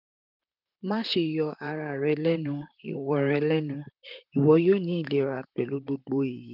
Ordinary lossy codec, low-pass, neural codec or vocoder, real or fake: none; 5.4 kHz; vocoder, 44.1 kHz, 128 mel bands, Pupu-Vocoder; fake